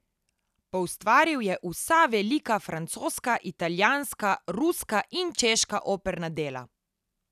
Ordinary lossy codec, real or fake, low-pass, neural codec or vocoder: none; real; 14.4 kHz; none